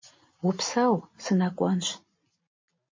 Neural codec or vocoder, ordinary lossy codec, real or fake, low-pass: none; MP3, 32 kbps; real; 7.2 kHz